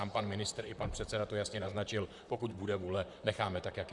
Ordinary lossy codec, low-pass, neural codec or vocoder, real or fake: Opus, 64 kbps; 10.8 kHz; vocoder, 44.1 kHz, 128 mel bands, Pupu-Vocoder; fake